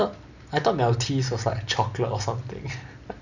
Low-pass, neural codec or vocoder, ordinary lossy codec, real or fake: 7.2 kHz; none; none; real